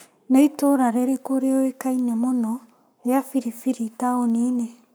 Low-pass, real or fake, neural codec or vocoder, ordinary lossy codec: none; fake; codec, 44.1 kHz, 7.8 kbps, Pupu-Codec; none